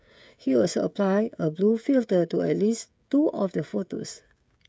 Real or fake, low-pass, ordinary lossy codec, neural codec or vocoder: fake; none; none; codec, 16 kHz, 16 kbps, FreqCodec, smaller model